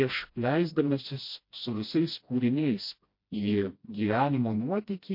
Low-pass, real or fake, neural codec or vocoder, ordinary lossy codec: 5.4 kHz; fake; codec, 16 kHz, 1 kbps, FreqCodec, smaller model; MP3, 32 kbps